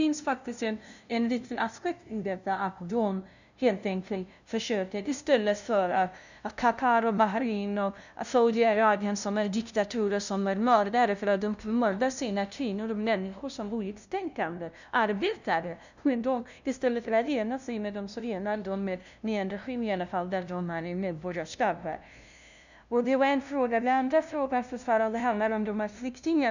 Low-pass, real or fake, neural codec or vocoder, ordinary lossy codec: 7.2 kHz; fake; codec, 16 kHz, 0.5 kbps, FunCodec, trained on LibriTTS, 25 frames a second; none